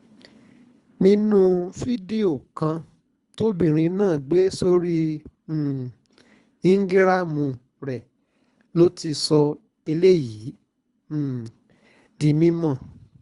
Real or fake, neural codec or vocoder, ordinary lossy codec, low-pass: fake; codec, 24 kHz, 3 kbps, HILCodec; Opus, 64 kbps; 10.8 kHz